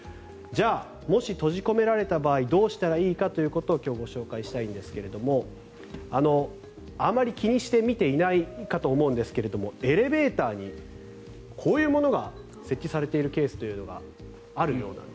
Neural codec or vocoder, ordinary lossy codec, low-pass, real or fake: none; none; none; real